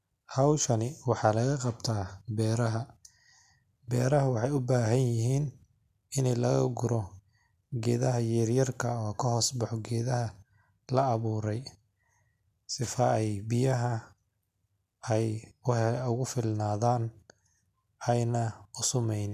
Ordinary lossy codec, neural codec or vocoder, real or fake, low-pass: MP3, 96 kbps; none; real; 14.4 kHz